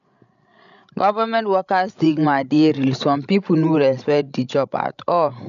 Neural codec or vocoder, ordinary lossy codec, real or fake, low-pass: codec, 16 kHz, 16 kbps, FreqCodec, larger model; none; fake; 7.2 kHz